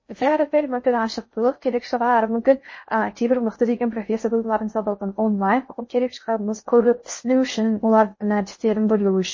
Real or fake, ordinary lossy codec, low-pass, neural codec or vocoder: fake; MP3, 32 kbps; 7.2 kHz; codec, 16 kHz in and 24 kHz out, 0.6 kbps, FocalCodec, streaming, 2048 codes